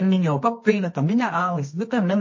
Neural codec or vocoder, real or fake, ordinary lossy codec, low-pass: codec, 24 kHz, 0.9 kbps, WavTokenizer, medium music audio release; fake; MP3, 32 kbps; 7.2 kHz